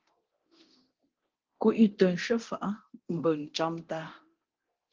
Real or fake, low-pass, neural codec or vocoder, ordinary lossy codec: fake; 7.2 kHz; codec, 24 kHz, 0.9 kbps, DualCodec; Opus, 16 kbps